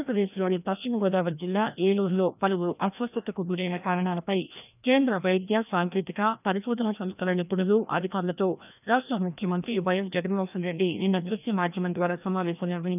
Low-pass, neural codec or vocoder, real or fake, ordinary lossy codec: 3.6 kHz; codec, 16 kHz, 1 kbps, FreqCodec, larger model; fake; none